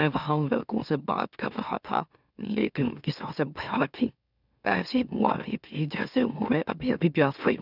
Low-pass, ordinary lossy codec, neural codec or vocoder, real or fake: 5.4 kHz; none; autoencoder, 44.1 kHz, a latent of 192 numbers a frame, MeloTTS; fake